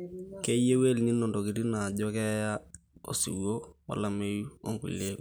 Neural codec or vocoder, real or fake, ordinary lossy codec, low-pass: none; real; none; none